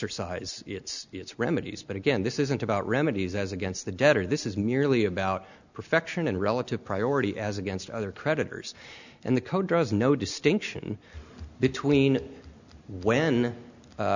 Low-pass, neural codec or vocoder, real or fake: 7.2 kHz; none; real